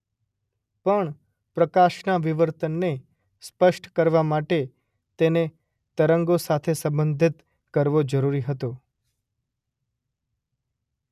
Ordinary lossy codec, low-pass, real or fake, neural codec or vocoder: none; 14.4 kHz; real; none